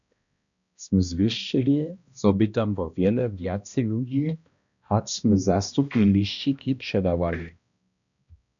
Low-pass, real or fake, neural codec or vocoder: 7.2 kHz; fake; codec, 16 kHz, 1 kbps, X-Codec, HuBERT features, trained on balanced general audio